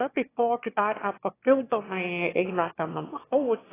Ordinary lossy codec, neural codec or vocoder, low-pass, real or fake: AAC, 16 kbps; autoencoder, 22.05 kHz, a latent of 192 numbers a frame, VITS, trained on one speaker; 3.6 kHz; fake